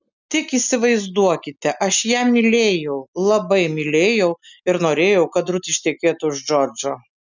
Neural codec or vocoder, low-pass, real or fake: none; 7.2 kHz; real